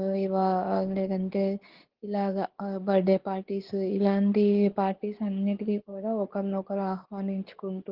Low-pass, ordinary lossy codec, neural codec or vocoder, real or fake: 5.4 kHz; Opus, 16 kbps; codec, 24 kHz, 0.9 kbps, WavTokenizer, medium speech release version 2; fake